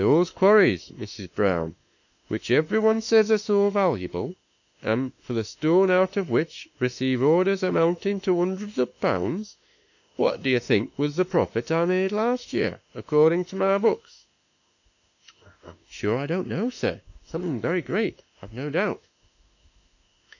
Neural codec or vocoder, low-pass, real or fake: autoencoder, 48 kHz, 32 numbers a frame, DAC-VAE, trained on Japanese speech; 7.2 kHz; fake